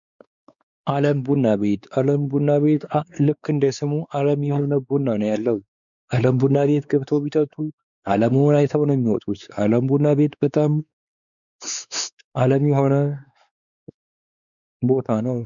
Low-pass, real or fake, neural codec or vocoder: 7.2 kHz; fake; codec, 16 kHz, 4 kbps, X-Codec, WavLM features, trained on Multilingual LibriSpeech